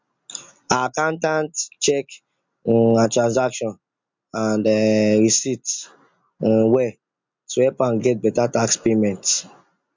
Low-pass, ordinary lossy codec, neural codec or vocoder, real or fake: 7.2 kHz; MP3, 64 kbps; none; real